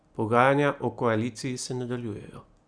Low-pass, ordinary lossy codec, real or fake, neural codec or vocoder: 9.9 kHz; Opus, 64 kbps; real; none